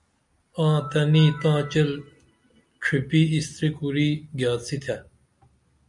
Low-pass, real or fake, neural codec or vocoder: 10.8 kHz; real; none